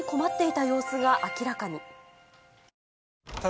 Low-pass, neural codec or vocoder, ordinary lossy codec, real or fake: none; none; none; real